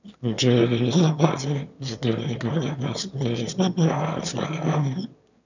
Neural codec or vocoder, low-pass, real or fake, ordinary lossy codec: autoencoder, 22.05 kHz, a latent of 192 numbers a frame, VITS, trained on one speaker; 7.2 kHz; fake; none